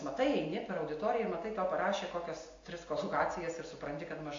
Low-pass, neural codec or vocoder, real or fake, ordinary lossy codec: 7.2 kHz; none; real; AAC, 48 kbps